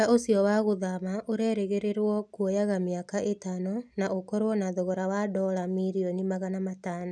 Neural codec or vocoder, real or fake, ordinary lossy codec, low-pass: none; real; none; none